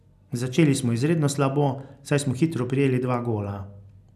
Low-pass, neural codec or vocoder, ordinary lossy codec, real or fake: 14.4 kHz; none; none; real